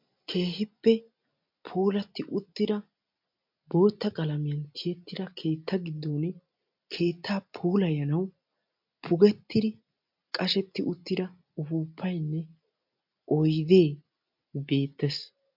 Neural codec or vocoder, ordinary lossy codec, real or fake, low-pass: none; MP3, 48 kbps; real; 5.4 kHz